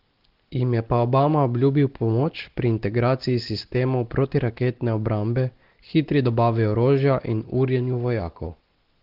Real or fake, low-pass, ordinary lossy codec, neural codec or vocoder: real; 5.4 kHz; Opus, 16 kbps; none